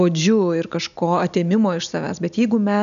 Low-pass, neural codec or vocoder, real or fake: 7.2 kHz; none; real